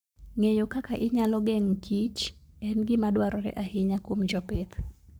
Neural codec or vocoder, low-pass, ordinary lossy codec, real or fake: codec, 44.1 kHz, 7.8 kbps, Pupu-Codec; none; none; fake